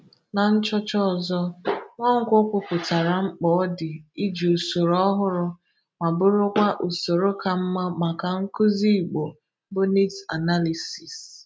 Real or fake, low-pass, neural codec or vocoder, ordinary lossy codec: real; none; none; none